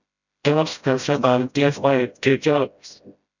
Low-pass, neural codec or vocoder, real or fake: 7.2 kHz; codec, 16 kHz, 0.5 kbps, FreqCodec, smaller model; fake